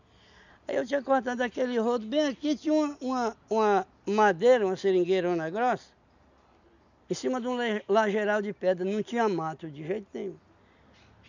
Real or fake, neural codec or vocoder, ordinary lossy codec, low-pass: real; none; none; 7.2 kHz